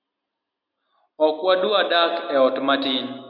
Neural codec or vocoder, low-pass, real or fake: none; 5.4 kHz; real